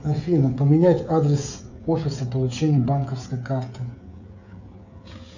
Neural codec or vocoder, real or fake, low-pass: codec, 16 kHz, 16 kbps, FreqCodec, smaller model; fake; 7.2 kHz